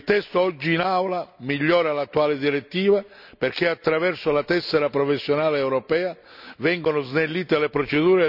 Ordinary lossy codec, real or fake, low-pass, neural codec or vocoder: none; real; 5.4 kHz; none